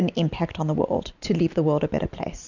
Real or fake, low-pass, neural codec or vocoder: real; 7.2 kHz; none